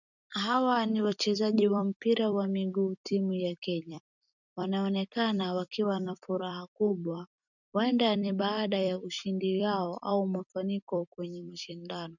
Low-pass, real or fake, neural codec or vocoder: 7.2 kHz; fake; vocoder, 44.1 kHz, 128 mel bands every 256 samples, BigVGAN v2